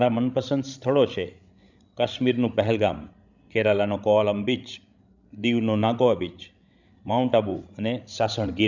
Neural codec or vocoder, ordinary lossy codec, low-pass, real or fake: codec, 16 kHz, 16 kbps, FreqCodec, larger model; none; 7.2 kHz; fake